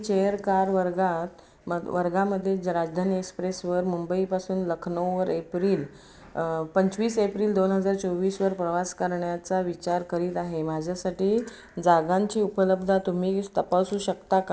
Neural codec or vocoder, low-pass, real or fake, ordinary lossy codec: none; none; real; none